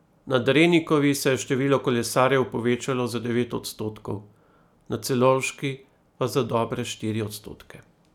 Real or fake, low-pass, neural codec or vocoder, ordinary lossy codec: real; 19.8 kHz; none; none